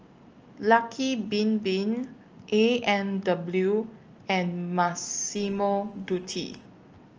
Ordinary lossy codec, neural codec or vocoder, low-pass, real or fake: Opus, 24 kbps; none; 7.2 kHz; real